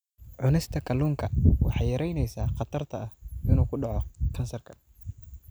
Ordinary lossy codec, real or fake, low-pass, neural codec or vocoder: none; real; none; none